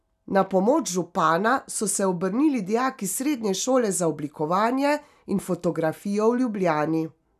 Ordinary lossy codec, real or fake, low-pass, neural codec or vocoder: none; real; 14.4 kHz; none